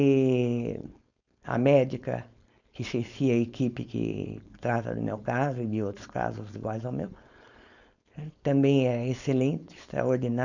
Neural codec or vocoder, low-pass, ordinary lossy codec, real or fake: codec, 16 kHz, 4.8 kbps, FACodec; 7.2 kHz; none; fake